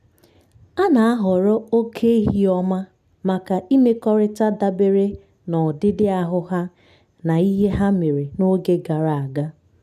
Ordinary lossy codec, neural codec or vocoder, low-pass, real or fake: none; none; 14.4 kHz; real